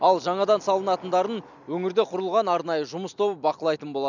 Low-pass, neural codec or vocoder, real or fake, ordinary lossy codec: 7.2 kHz; none; real; none